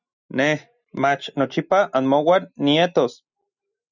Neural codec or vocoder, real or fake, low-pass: none; real; 7.2 kHz